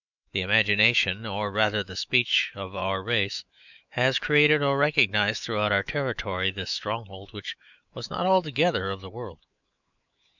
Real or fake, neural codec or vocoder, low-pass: fake; autoencoder, 48 kHz, 128 numbers a frame, DAC-VAE, trained on Japanese speech; 7.2 kHz